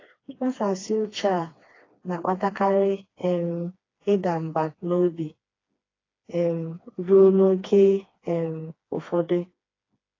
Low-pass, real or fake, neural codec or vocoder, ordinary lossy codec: 7.2 kHz; fake; codec, 16 kHz, 2 kbps, FreqCodec, smaller model; AAC, 32 kbps